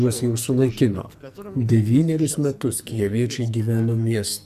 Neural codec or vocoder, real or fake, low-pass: codec, 44.1 kHz, 2.6 kbps, SNAC; fake; 14.4 kHz